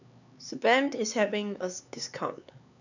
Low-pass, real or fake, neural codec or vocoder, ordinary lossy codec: 7.2 kHz; fake; codec, 16 kHz, 4 kbps, X-Codec, HuBERT features, trained on LibriSpeech; none